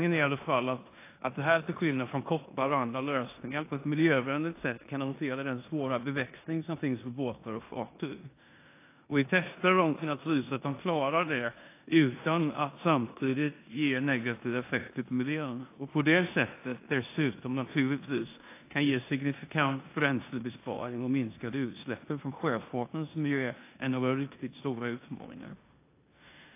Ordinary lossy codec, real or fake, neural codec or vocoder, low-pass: AAC, 24 kbps; fake; codec, 16 kHz in and 24 kHz out, 0.9 kbps, LongCat-Audio-Codec, four codebook decoder; 3.6 kHz